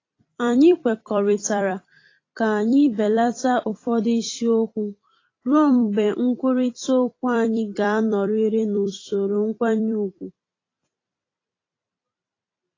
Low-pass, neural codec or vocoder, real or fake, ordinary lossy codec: 7.2 kHz; vocoder, 44.1 kHz, 128 mel bands every 256 samples, BigVGAN v2; fake; AAC, 32 kbps